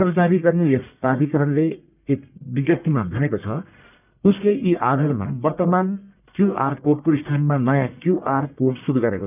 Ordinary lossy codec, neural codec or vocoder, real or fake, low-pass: none; codec, 44.1 kHz, 1.7 kbps, Pupu-Codec; fake; 3.6 kHz